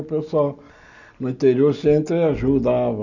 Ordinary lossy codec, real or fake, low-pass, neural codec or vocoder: none; real; 7.2 kHz; none